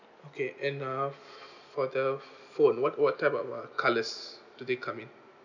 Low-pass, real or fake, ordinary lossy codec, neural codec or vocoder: 7.2 kHz; real; none; none